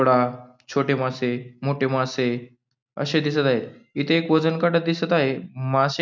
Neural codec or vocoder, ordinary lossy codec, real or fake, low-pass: none; none; real; none